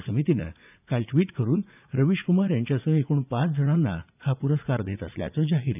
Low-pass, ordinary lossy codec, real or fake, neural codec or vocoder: 3.6 kHz; none; fake; vocoder, 44.1 kHz, 80 mel bands, Vocos